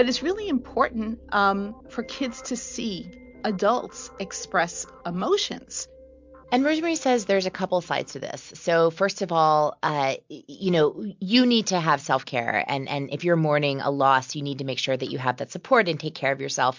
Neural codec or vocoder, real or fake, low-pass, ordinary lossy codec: none; real; 7.2 kHz; MP3, 64 kbps